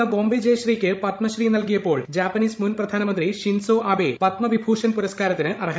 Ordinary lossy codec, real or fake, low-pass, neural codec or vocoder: none; fake; none; codec, 16 kHz, 16 kbps, FreqCodec, larger model